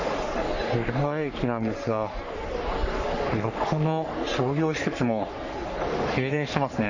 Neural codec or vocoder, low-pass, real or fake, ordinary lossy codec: codec, 44.1 kHz, 3.4 kbps, Pupu-Codec; 7.2 kHz; fake; none